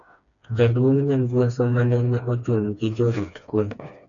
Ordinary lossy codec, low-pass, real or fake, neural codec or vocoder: MP3, 96 kbps; 7.2 kHz; fake; codec, 16 kHz, 2 kbps, FreqCodec, smaller model